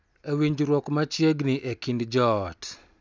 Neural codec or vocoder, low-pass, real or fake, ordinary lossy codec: none; none; real; none